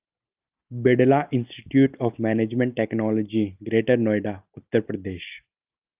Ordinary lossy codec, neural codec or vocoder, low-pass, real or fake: Opus, 24 kbps; none; 3.6 kHz; real